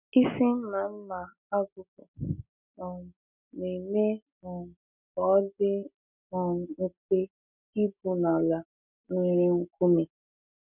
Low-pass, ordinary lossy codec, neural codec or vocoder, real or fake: 3.6 kHz; none; none; real